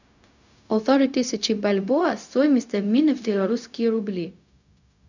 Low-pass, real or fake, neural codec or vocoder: 7.2 kHz; fake; codec, 16 kHz, 0.4 kbps, LongCat-Audio-Codec